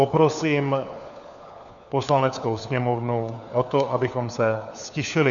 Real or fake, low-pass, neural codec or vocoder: fake; 7.2 kHz; codec, 16 kHz, 4 kbps, FunCodec, trained on LibriTTS, 50 frames a second